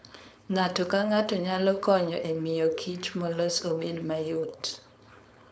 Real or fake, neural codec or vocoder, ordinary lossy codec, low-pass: fake; codec, 16 kHz, 4.8 kbps, FACodec; none; none